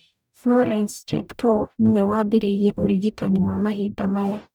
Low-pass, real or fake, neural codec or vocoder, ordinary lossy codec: none; fake; codec, 44.1 kHz, 0.9 kbps, DAC; none